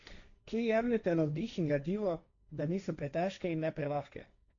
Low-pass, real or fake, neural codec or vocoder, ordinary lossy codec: 7.2 kHz; fake; codec, 16 kHz, 1.1 kbps, Voila-Tokenizer; none